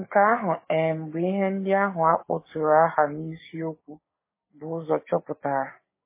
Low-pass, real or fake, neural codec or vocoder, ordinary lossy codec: 3.6 kHz; fake; codec, 16 kHz, 6 kbps, DAC; MP3, 16 kbps